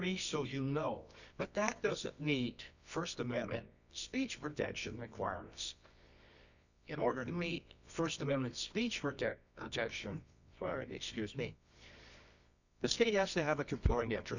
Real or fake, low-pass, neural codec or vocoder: fake; 7.2 kHz; codec, 24 kHz, 0.9 kbps, WavTokenizer, medium music audio release